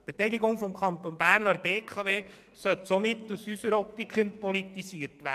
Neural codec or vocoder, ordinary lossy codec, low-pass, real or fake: codec, 44.1 kHz, 2.6 kbps, SNAC; MP3, 96 kbps; 14.4 kHz; fake